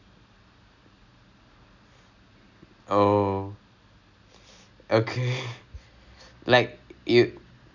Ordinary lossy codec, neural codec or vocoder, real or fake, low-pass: none; none; real; 7.2 kHz